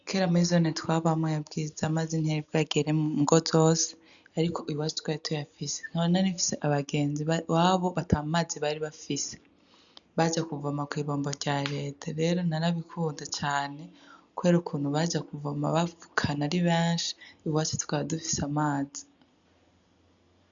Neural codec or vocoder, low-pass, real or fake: none; 7.2 kHz; real